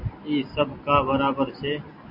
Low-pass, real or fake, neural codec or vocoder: 5.4 kHz; real; none